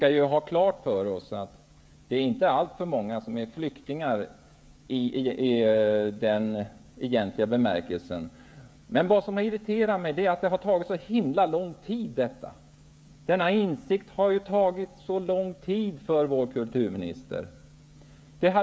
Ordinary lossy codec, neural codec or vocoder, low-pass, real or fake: none; codec, 16 kHz, 16 kbps, FreqCodec, smaller model; none; fake